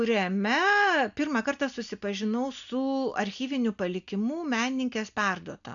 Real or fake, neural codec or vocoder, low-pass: real; none; 7.2 kHz